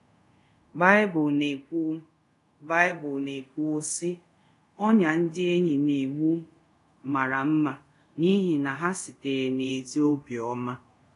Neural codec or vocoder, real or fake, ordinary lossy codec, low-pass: codec, 24 kHz, 0.5 kbps, DualCodec; fake; AAC, 48 kbps; 10.8 kHz